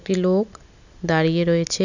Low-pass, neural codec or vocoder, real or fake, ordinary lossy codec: 7.2 kHz; none; real; none